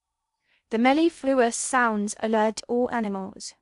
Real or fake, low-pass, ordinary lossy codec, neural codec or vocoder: fake; 10.8 kHz; AAC, 96 kbps; codec, 16 kHz in and 24 kHz out, 0.6 kbps, FocalCodec, streaming, 2048 codes